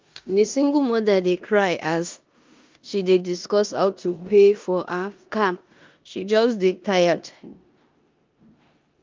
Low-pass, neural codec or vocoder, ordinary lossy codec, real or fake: 7.2 kHz; codec, 16 kHz in and 24 kHz out, 0.9 kbps, LongCat-Audio-Codec, four codebook decoder; Opus, 24 kbps; fake